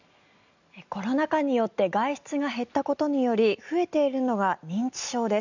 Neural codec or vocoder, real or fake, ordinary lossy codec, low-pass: none; real; none; 7.2 kHz